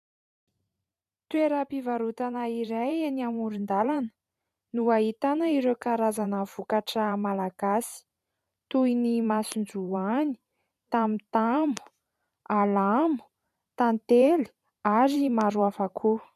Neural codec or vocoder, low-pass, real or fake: vocoder, 44.1 kHz, 128 mel bands every 512 samples, BigVGAN v2; 14.4 kHz; fake